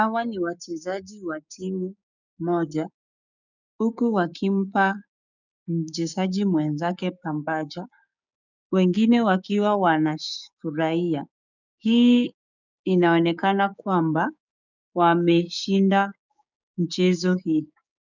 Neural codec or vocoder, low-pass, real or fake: codec, 16 kHz, 6 kbps, DAC; 7.2 kHz; fake